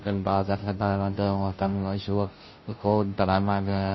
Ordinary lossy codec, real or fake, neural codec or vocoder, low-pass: MP3, 24 kbps; fake; codec, 16 kHz, 0.5 kbps, FunCodec, trained on Chinese and English, 25 frames a second; 7.2 kHz